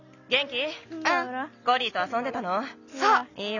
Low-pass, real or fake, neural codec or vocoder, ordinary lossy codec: 7.2 kHz; real; none; none